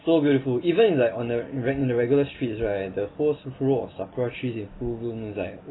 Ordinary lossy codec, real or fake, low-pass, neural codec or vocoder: AAC, 16 kbps; real; 7.2 kHz; none